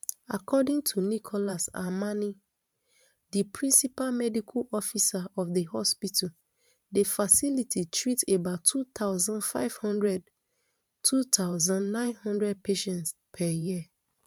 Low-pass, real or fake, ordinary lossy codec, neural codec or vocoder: 19.8 kHz; fake; none; vocoder, 44.1 kHz, 128 mel bands every 512 samples, BigVGAN v2